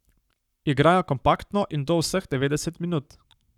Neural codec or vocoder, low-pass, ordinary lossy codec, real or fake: codec, 44.1 kHz, 7.8 kbps, Pupu-Codec; 19.8 kHz; none; fake